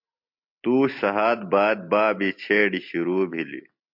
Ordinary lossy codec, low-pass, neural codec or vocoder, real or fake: MP3, 48 kbps; 5.4 kHz; none; real